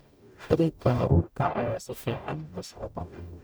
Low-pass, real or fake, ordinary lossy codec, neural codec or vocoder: none; fake; none; codec, 44.1 kHz, 0.9 kbps, DAC